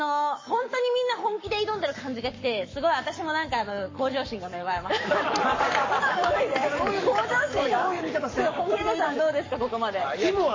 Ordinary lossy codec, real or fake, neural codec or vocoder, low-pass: MP3, 32 kbps; fake; codec, 44.1 kHz, 7.8 kbps, Pupu-Codec; 7.2 kHz